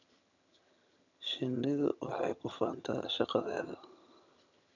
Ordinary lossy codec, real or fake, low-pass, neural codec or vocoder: none; fake; 7.2 kHz; vocoder, 22.05 kHz, 80 mel bands, HiFi-GAN